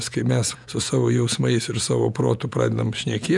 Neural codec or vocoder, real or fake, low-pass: vocoder, 48 kHz, 128 mel bands, Vocos; fake; 10.8 kHz